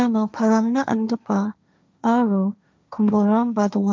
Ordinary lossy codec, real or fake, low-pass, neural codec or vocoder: none; fake; none; codec, 16 kHz, 1.1 kbps, Voila-Tokenizer